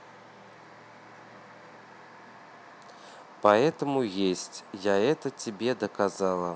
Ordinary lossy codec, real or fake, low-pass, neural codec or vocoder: none; real; none; none